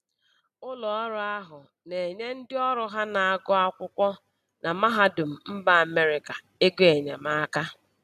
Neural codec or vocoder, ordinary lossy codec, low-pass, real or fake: none; none; 14.4 kHz; real